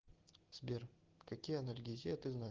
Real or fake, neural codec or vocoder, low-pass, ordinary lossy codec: real; none; 7.2 kHz; Opus, 16 kbps